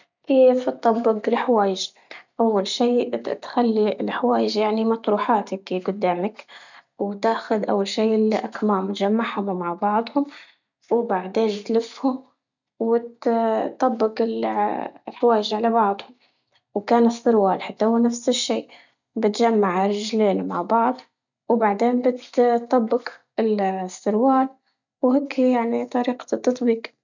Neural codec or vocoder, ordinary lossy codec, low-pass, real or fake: none; none; 7.2 kHz; real